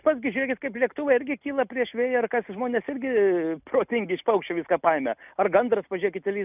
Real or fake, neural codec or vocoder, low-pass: real; none; 3.6 kHz